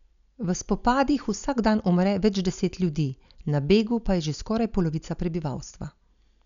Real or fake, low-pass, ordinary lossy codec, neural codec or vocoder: real; 7.2 kHz; none; none